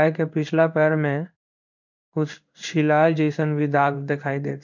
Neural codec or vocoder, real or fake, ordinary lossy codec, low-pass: codec, 16 kHz in and 24 kHz out, 1 kbps, XY-Tokenizer; fake; none; 7.2 kHz